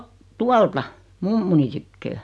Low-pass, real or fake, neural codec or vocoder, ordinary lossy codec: none; real; none; none